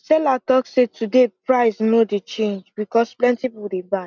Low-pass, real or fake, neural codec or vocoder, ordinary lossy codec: 7.2 kHz; real; none; none